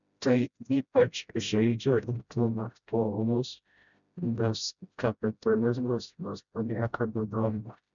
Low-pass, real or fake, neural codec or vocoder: 7.2 kHz; fake; codec, 16 kHz, 0.5 kbps, FreqCodec, smaller model